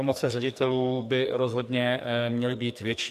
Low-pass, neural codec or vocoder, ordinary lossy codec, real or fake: 14.4 kHz; codec, 44.1 kHz, 2.6 kbps, SNAC; MP3, 96 kbps; fake